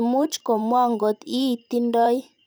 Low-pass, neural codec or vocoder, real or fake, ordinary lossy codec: none; vocoder, 44.1 kHz, 128 mel bands every 512 samples, BigVGAN v2; fake; none